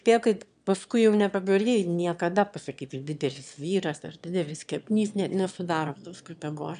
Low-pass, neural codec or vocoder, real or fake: 9.9 kHz; autoencoder, 22.05 kHz, a latent of 192 numbers a frame, VITS, trained on one speaker; fake